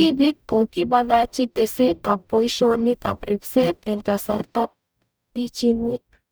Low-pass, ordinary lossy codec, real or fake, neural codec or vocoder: none; none; fake; codec, 44.1 kHz, 0.9 kbps, DAC